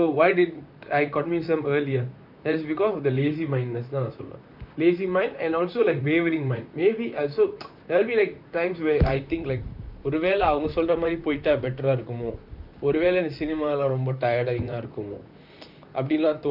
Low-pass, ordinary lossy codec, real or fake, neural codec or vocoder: 5.4 kHz; none; fake; vocoder, 44.1 kHz, 128 mel bands, Pupu-Vocoder